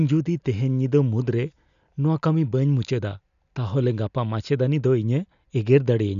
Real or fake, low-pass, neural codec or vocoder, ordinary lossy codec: real; 7.2 kHz; none; none